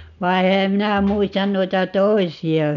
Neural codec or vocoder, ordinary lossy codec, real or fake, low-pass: none; none; real; 7.2 kHz